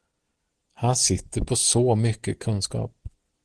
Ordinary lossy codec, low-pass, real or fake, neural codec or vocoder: Opus, 16 kbps; 10.8 kHz; real; none